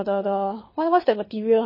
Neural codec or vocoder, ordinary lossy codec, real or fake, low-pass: codec, 24 kHz, 6 kbps, HILCodec; MP3, 24 kbps; fake; 5.4 kHz